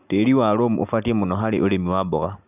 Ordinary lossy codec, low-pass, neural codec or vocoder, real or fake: none; 3.6 kHz; none; real